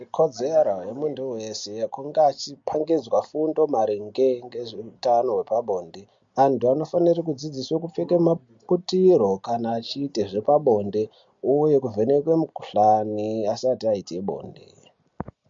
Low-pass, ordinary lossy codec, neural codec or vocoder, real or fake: 7.2 kHz; MP3, 48 kbps; none; real